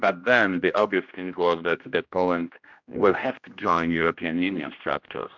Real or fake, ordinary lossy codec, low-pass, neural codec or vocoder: fake; MP3, 64 kbps; 7.2 kHz; codec, 16 kHz, 1 kbps, X-Codec, HuBERT features, trained on general audio